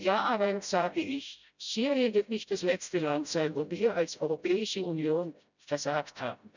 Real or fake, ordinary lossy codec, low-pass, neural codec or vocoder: fake; none; 7.2 kHz; codec, 16 kHz, 0.5 kbps, FreqCodec, smaller model